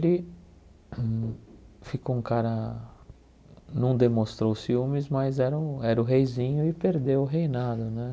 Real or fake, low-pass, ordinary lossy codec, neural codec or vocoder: real; none; none; none